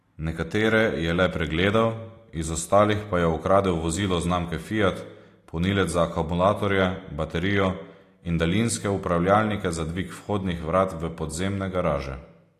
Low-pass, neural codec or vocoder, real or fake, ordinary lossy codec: 14.4 kHz; none; real; AAC, 48 kbps